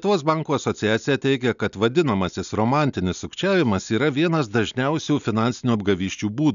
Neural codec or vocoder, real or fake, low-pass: none; real; 7.2 kHz